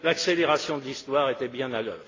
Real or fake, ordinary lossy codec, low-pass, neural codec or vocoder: real; AAC, 32 kbps; 7.2 kHz; none